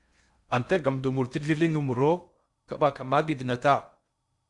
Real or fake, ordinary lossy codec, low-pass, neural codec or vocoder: fake; Opus, 64 kbps; 10.8 kHz; codec, 16 kHz in and 24 kHz out, 0.6 kbps, FocalCodec, streaming, 4096 codes